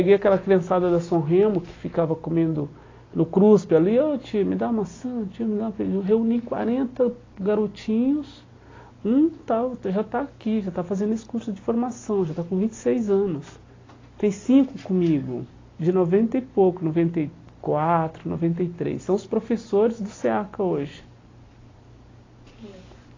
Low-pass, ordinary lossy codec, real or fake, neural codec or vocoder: 7.2 kHz; AAC, 32 kbps; real; none